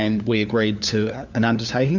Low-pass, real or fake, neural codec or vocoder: 7.2 kHz; fake; codec, 16 kHz, 4 kbps, FunCodec, trained on LibriTTS, 50 frames a second